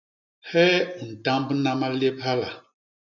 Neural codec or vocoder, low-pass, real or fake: none; 7.2 kHz; real